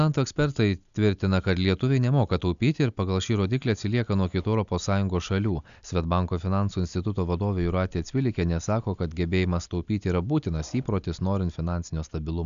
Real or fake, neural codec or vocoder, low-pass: real; none; 7.2 kHz